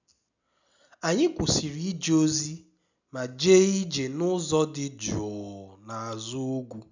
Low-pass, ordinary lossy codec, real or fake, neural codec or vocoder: 7.2 kHz; none; real; none